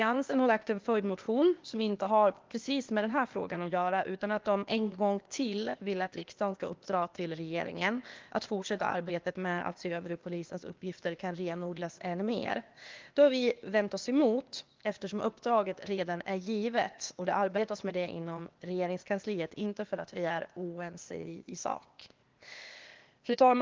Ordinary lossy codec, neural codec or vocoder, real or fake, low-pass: Opus, 32 kbps; codec, 16 kHz, 0.8 kbps, ZipCodec; fake; 7.2 kHz